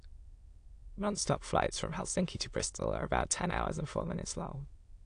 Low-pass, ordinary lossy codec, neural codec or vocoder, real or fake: 9.9 kHz; AAC, 48 kbps; autoencoder, 22.05 kHz, a latent of 192 numbers a frame, VITS, trained on many speakers; fake